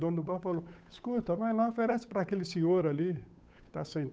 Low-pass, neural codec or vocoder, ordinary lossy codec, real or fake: none; codec, 16 kHz, 8 kbps, FunCodec, trained on Chinese and English, 25 frames a second; none; fake